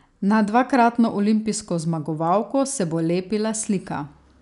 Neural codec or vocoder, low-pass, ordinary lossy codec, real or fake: none; 10.8 kHz; none; real